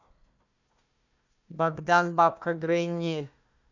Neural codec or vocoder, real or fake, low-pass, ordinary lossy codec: codec, 16 kHz, 1 kbps, FunCodec, trained on Chinese and English, 50 frames a second; fake; 7.2 kHz; none